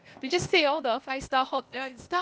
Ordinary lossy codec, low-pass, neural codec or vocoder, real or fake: none; none; codec, 16 kHz, 0.8 kbps, ZipCodec; fake